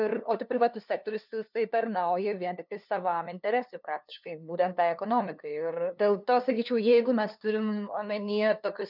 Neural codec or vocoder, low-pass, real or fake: codec, 16 kHz, 2 kbps, FunCodec, trained on LibriTTS, 25 frames a second; 5.4 kHz; fake